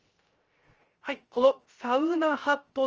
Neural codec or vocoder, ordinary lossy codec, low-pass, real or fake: codec, 16 kHz, 0.3 kbps, FocalCodec; Opus, 24 kbps; 7.2 kHz; fake